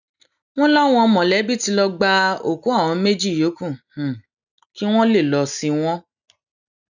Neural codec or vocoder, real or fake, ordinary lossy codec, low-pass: none; real; none; 7.2 kHz